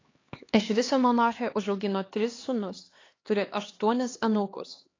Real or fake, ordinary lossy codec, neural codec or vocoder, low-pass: fake; AAC, 32 kbps; codec, 16 kHz, 2 kbps, X-Codec, HuBERT features, trained on LibriSpeech; 7.2 kHz